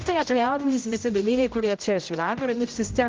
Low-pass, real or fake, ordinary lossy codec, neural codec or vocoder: 7.2 kHz; fake; Opus, 24 kbps; codec, 16 kHz, 0.5 kbps, X-Codec, HuBERT features, trained on general audio